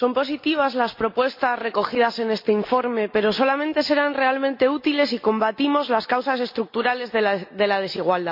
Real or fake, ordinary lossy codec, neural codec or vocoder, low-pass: real; MP3, 32 kbps; none; 5.4 kHz